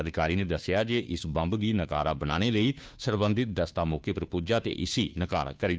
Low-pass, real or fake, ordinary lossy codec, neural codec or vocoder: none; fake; none; codec, 16 kHz, 2 kbps, FunCodec, trained on Chinese and English, 25 frames a second